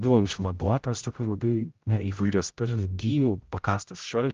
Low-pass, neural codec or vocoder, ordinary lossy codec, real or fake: 7.2 kHz; codec, 16 kHz, 0.5 kbps, X-Codec, HuBERT features, trained on general audio; Opus, 32 kbps; fake